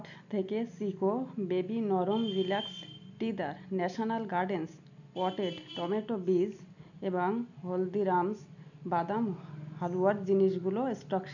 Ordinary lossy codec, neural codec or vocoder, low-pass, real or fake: none; none; 7.2 kHz; real